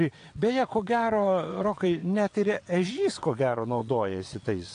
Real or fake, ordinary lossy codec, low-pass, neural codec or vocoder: fake; AAC, 48 kbps; 9.9 kHz; vocoder, 22.05 kHz, 80 mel bands, WaveNeXt